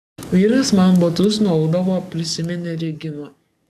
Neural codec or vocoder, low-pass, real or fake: codec, 44.1 kHz, 7.8 kbps, DAC; 14.4 kHz; fake